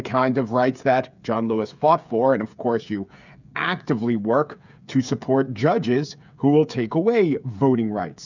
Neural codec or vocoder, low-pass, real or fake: codec, 16 kHz, 8 kbps, FreqCodec, smaller model; 7.2 kHz; fake